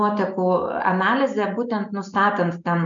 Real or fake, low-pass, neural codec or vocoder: real; 7.2 kHz; none